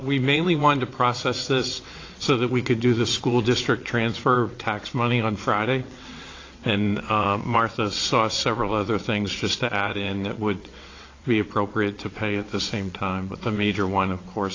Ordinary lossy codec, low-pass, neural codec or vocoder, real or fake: AAC, 32 kbps; 7.2 kHz; vocoder, 22.05 kHz, 80 mel bands, WaveNeXt; fake